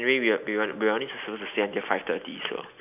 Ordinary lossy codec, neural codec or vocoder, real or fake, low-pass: none; none; real; 3.6 kHz